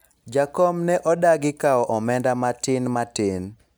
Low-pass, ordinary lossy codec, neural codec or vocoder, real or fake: none; none; none; real